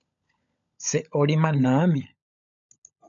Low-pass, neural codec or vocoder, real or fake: 7.2 kHz; codec, 16 kHz, 16 kbps, FunCodec, trained on LibriTTS, 50 frames a second; fake